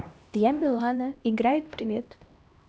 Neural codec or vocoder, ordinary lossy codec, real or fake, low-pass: codec, 16 kHz, 1 kbps, X-Codec, HuBERT features, trained on LibriSpeech; none; fake; none